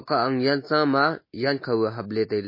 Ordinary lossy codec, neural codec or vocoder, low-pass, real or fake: MP3, 24 kbps; none; 5.4 kHz; real